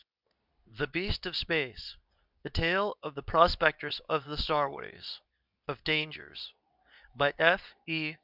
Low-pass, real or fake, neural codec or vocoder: 5.4 kHz; fake; codec, 24 kHz, 0.9 kbps, WavTokenizer, medium speech release version 2